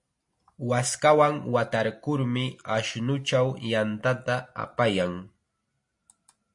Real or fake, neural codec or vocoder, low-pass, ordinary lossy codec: real; none; 10.8 kHz; MP3, 48 kbps